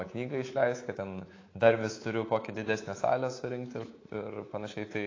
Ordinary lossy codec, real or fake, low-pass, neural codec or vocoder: AAC, 32 kbps; fake; 7.2 kHz; codec, 24 kHz, 3.1 kbps, DualCodec